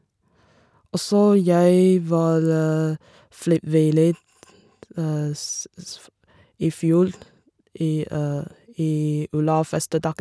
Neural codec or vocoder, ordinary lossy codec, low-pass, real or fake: none; none; none; real